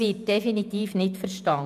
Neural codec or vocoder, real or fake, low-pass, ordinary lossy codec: vocoder, 48 kHz, 128 mel bands, Vocos; fake; 14.4 kHz; none